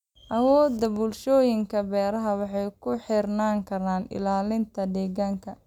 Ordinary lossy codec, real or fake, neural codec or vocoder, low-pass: none; real; none; 19.8 kHz